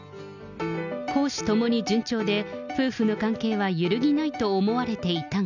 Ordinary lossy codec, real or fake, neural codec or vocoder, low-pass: none; real; none; 7.2 kHz